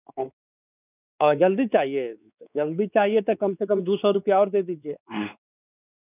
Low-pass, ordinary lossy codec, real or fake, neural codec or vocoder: 3.6 kHz; none; fake; codec, 16 kHz, 4 kbps, X-Codec, WavLM features, trained on Multilingual LibriSpeech